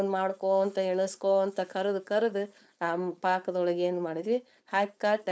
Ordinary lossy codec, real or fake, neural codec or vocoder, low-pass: none; fake; codec, 16 kHz, 4.8 kbps, FACodec; none